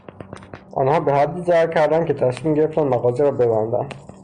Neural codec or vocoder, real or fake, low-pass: none; real; 9.9 kHz